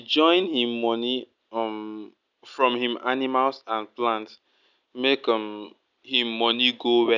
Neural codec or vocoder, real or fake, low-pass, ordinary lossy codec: none; real; 7.2 kHz; none